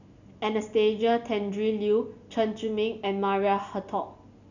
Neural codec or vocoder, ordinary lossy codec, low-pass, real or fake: none; none; 7.2 kHz; real